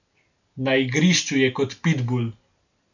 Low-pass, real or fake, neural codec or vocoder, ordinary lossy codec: 7.2 kHz; real; none; none